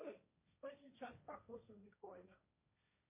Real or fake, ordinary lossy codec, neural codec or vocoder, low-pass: fake; MP3, 24 kbps; codec, 16 kHz, 1.1 kbps, Voila-Tokenizer; 3.6 kHz